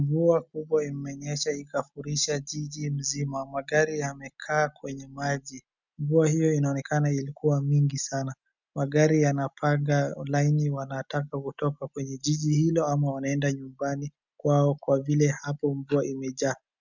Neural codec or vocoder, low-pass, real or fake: none; 7.2 kHz; real